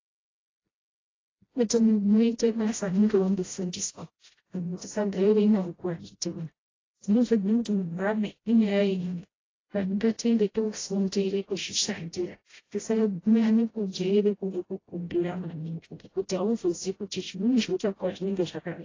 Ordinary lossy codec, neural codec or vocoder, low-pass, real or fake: AAC, 32 kbps; codec, 16 kHz, 0.5 kbps, FreqCodec, smaller model; 7.2 kHz; fake